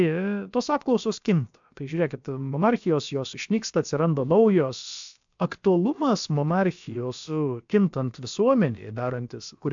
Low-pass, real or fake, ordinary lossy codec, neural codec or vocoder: 7.2 kHz; fake; MP3, 64 kbps; codec, 16 kHz, about 1 kbps, DyCAST, with the encoder's durations